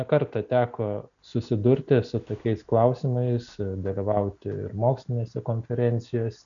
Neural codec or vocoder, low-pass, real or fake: none; 7.2 kHz; real